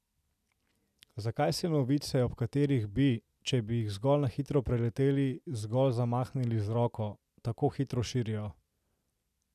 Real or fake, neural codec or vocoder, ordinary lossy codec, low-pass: real; none; none; 14.4 kHz